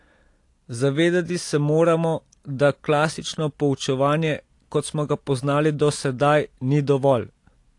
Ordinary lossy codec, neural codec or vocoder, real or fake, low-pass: AAC, 48 kbps; none; real; 10.8 kHz